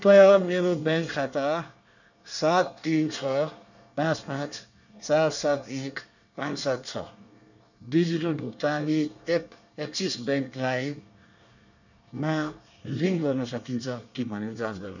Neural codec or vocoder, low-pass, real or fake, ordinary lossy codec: codec, 24 kHz, 1 kbps, SNAC; 7.2 kHz; fake; none